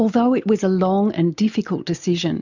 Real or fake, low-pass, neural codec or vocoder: real; 7.2 kHz; none